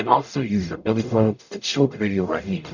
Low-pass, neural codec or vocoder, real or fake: 7.2 kHz; codec, 44.1 kHz, 0.9 kbps, DAC; fake